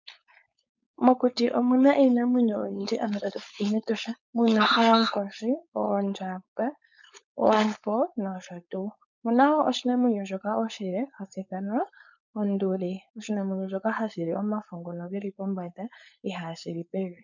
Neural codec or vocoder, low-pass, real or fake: codec, 16 kHz, 4.8 kbps, FACodec; 7.2 kHz; fake